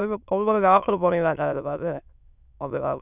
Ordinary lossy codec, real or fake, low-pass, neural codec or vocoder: none; fake; 3.6 kHz; autoencoder, 22.05 kHz, a latent of 192 numbers a frame, VITS, trained on many speakers